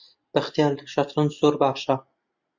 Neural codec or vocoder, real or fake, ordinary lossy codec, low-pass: none; real; MP3, 64 kbps; 7.2 kHz